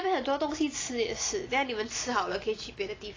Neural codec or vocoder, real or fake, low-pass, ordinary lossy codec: none; real; 7.2 kHz; AAC, 32 kbps